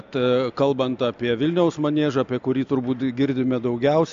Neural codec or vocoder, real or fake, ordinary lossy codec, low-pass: none; real; AAC, 64 kbps; 7.2 kHz